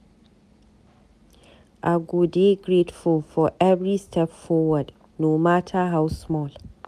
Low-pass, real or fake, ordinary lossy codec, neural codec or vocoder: 14.4 kHz; real; none; none